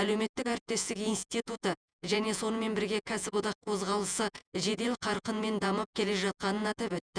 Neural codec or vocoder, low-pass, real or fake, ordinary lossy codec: vocoder, 48 kHz, 128 mel bands, Vocos; 9.9 kHz; fake; none